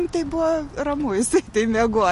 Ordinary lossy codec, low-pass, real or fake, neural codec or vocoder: MP3, 48 kbps; 14.4 kHz; real; none